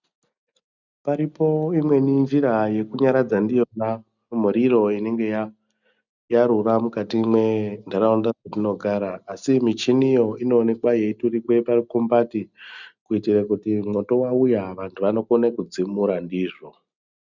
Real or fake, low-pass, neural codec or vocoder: real; 7.2 kHz; none